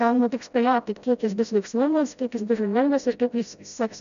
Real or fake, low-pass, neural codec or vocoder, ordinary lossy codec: fake; 7.2 kHz; codec, 16 kHz, 0.5 kbps, FreqCodec, smaller model; MP3, 96 kbps